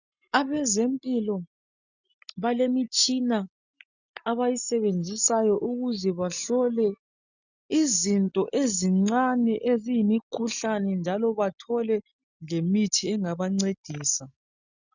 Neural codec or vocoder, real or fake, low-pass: none; real; 7.2 kHz